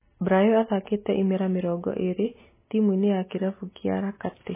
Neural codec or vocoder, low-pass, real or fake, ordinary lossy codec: none; 3.6 kHz; real; MP3, 16 kbps